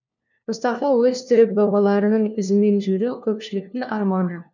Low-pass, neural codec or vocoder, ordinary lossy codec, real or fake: 7.2 kHz; codec, 16 kHz, 1 kbps, FunCodec, trained on LibriTTS, 50 frames a second; none; fake